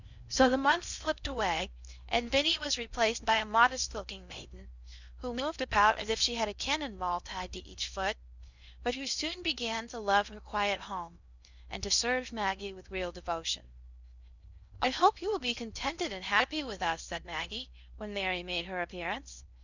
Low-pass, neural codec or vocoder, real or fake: 7.2 kHz; codec, 16 kHz in and 24 kHz out, 0.6 kbps, FocalCodec, streaming, 4096 codes; fake